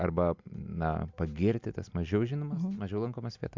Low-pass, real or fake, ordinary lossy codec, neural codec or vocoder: 7.2 kHz; real; MP3, 64 kbps; none